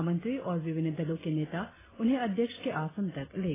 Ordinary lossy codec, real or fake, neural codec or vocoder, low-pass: AAC, 16 kbps; real; none; 3.6 kHz